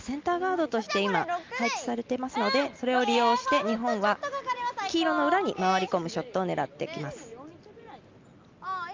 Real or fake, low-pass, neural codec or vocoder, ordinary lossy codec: real; 7.2 kHz; none; Opus, 24 kbps